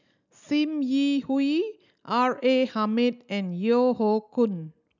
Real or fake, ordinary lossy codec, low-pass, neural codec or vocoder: real; none; 7.2 kHz; none